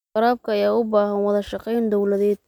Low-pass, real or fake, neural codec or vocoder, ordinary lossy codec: 19.8 kHz; real; none; none